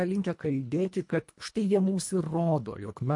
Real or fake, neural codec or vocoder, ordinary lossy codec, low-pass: fake; codec, 24 kHz, 1.5 kbps, HILCodec; MP3, 48 kbps; 10.8 kHz